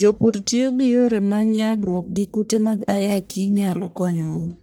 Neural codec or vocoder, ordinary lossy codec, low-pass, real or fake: codec, 44.1 kHz, 1.7 kbps, Pupu-Codec; none; none; fake